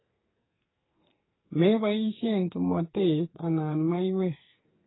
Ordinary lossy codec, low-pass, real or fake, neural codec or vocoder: AAC, 16 kbps; 7.2 kHz; fake; codec, 44.1 kHz, 2.6 kbps, SNAC